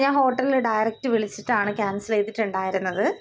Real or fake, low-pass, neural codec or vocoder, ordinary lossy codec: real; none; none; none